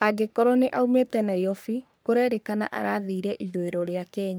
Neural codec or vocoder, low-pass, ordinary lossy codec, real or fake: codec, 44.1 kHz, 3.4 kbps, Pupu-Codec; none; none; fake